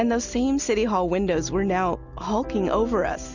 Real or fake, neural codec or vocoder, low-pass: real; none; 7.2 kHz